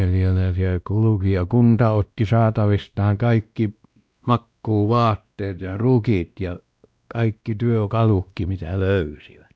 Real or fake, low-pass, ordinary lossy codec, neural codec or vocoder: fake; none; none; codec, 16 kHz, 1 kbps, X-Codec, WavLM features, trained on Multilingual LibriSpeech